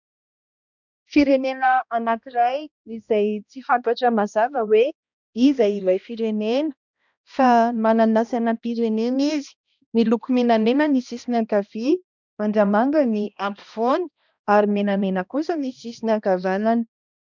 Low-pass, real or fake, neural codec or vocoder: 7.2 kHz; fake; codec, 16 kHz, 1 kbps, X-Codec, HuBERT features, trained on balanced general audio